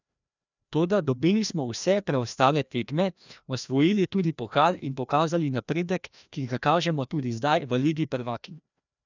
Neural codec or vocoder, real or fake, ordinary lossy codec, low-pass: codec, 16 kHz, 1 kbps, FreqCodec, larger model; fake; none; 7.2 kHz